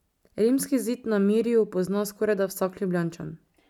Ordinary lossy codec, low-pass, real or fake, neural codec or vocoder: none; 19.8 kHz; real; none